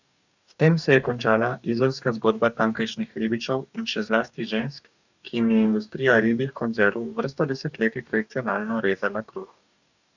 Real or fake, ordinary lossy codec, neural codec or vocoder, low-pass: fake; none; codec, 44.1 kHz, 2.6 kbps, DAC; 7.2 kHz